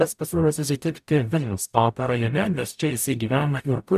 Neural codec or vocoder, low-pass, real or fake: codec, 44.1 kHz, 0.9 kbps, DAC; 14.4 kHz; fake